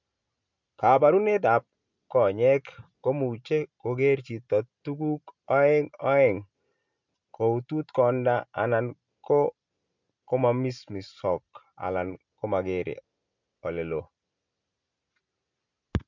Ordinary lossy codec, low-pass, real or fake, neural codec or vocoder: MP3, 64 kbps; 7.2 kHz; real; none